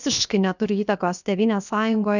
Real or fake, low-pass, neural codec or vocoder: fake; 7.2 kHz; codec, 16 kHz, 0.7 kbps, FocalCodec